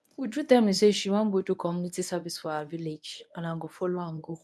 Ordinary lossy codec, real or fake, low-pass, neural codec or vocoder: none; fake; none; codec, 24 kHz, 0.9 kbps, WavTokenizer, medium speech release version 1